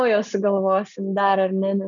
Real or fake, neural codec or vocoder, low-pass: real; none; 7.2 kHz